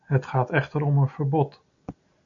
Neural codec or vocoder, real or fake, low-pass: none; real; 7.2 kHz